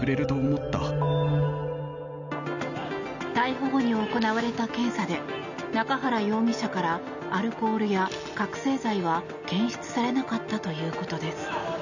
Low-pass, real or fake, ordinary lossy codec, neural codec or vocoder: 7.2 kHz; real; none; none